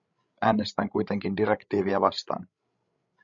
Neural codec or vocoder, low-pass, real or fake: codec, 16 kHz, 16 kbps, FreqCodec, larger model; 7.2 kHz; fake